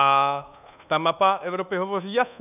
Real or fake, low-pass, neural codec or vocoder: fake; 3.6 kHz; codec, 24 kHz, 1.2 kbps, DualCodec